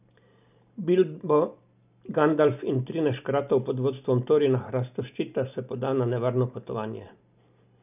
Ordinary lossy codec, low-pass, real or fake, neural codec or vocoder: none; 3.6 kHz; real; none